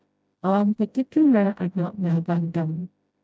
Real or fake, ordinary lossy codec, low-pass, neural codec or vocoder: fake; none; none; codec, 16 kHz, 0.5 kbps, FreqCodec, smaller model